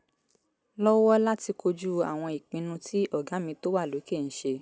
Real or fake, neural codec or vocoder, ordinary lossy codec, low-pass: real; none; none; none